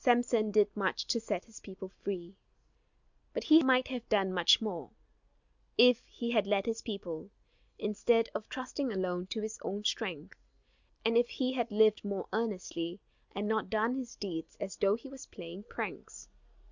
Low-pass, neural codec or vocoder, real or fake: 7.2 kHz; none; real